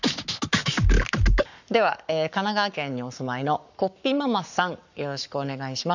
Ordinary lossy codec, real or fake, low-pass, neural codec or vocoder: none; fake; 7.2 kHz; codec, 44.1 kHz, 7.8 kbps, Pupu-Codec